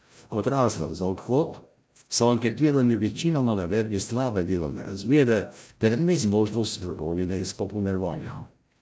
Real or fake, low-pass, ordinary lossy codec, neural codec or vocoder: fake; none; none; codec, 16 kHz, 0.5 kbps, FreqCodec, larger model